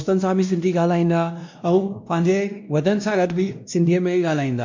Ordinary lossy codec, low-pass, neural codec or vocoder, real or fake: MP3, 48 kbps; 7.2 kHz; codec, 16 kHz, 1 kbps, X-Codec, WavLM features, trained on Multilingual LibriSpeech; fake